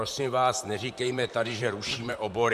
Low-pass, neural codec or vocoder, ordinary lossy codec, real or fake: 14.4 kHz; vocoder, 44.1 kHz, 128 mel bands, Pupu-Vocoder; AAC, 96 kbps; fake